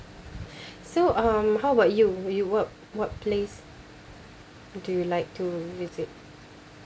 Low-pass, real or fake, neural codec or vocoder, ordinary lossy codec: none; real; none; none